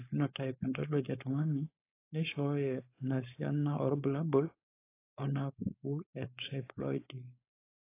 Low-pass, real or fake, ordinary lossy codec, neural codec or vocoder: 3.6 kHz; fake; AAC, 24 kbps; codec, 16 kHz, 4.8 kbps, FACodec